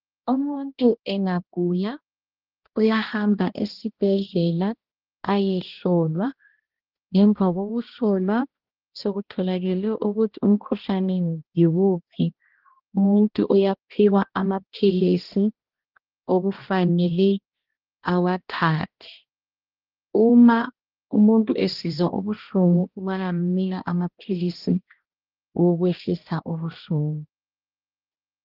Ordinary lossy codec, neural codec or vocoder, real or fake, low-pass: Opus, 16 kbps; codec, 16 kHz, 1 kbps, X-Codec, HuBERT features, trained on balanced general audio; fake; 5.4 kHz